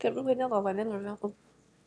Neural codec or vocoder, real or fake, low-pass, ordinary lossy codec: autoencoder, 22.05 kHz, a latent of 192 numbers a frame, VITS, trained on one speaker; fake; none; none